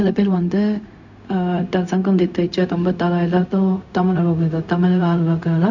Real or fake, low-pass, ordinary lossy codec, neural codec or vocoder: fake; 7.2 kHz; none; codec, 16 kHz, 0.4 kbps, LongCat-Audio-Codec